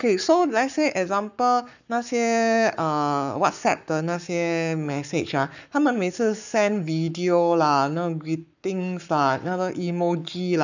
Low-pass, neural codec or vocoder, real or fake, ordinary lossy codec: 7.2 kHz; codec, 44.1 kHz, 7.8 kbps, Pupu-Codec; fake; none